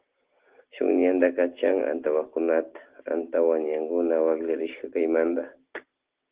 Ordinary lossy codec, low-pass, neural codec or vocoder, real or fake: Opus, 16 kbps; 3.6 kHz; none; real